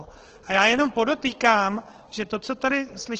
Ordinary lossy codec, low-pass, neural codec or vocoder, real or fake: Opus, 16 kbps; 7.2 kHz; codec, 16 kHz, 4 kbps, FunCodec, trained on Chinese and English, 50 frames a second; fake